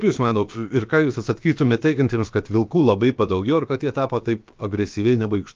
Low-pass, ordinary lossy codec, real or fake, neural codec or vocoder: 7.2 kHz; Opus, 24 kbps; fake; codec, 16 kHz, about 1 kbps, DyCAST, with the encoder's durations